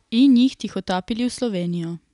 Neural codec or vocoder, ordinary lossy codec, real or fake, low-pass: none; none; real; 10.8 kHz